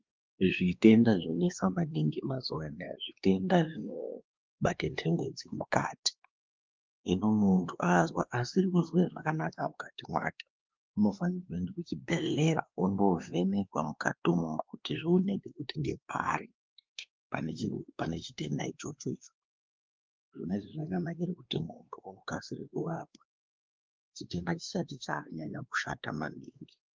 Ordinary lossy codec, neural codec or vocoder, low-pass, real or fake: Opus, 32 kbps; codec, 16 kHz, 2 kbps, X-Codec, WavLM features, trained on Multilingual LibriSpeech; 7.2 kHz; fake